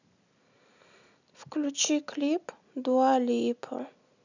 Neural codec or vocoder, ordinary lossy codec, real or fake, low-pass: none; none; real; 7.2 kHz